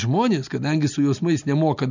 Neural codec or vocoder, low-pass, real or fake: none; 7.2 kHz; real